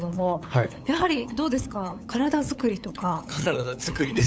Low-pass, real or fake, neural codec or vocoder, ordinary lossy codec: none; fake; codec, 16 kHz, 8 kbps, FunCodec, trained on LibriTTS, 25 frames a second; none